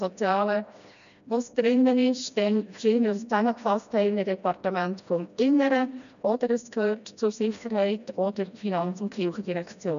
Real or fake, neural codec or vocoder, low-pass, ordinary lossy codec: fake; codec, 16 kHz, 1 kbps, FreqCodec, smaller model; 7.2 kHz; none